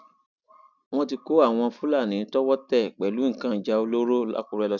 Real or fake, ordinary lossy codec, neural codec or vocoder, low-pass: real; none; none; 7.2 kHz